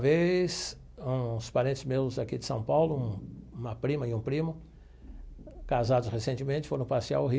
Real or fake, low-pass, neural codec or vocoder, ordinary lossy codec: real; none; none; none